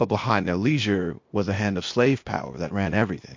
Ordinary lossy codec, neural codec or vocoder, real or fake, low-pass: MP3, 48 kbps; codec, 16 kHz, 0.8 kbps, ZipCodec; fake; 7.2 kHz